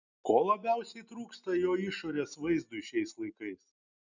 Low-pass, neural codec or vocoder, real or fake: 7.2 kHz; none; real